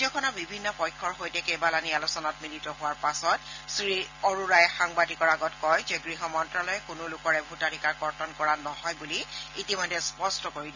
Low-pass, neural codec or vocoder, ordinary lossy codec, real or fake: 7.2 kHz; none; none; real